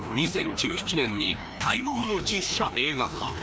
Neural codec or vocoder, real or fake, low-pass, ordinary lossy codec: codec, 16 kHz, 1 kbps, FreqCodec, larger model; fake; none; none